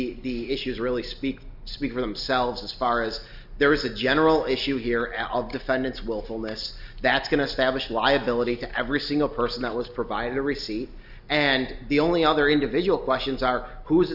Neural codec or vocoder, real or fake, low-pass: none; real; 5.4 kHz